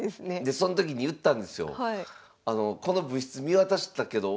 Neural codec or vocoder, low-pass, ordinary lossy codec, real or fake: none; none; none; real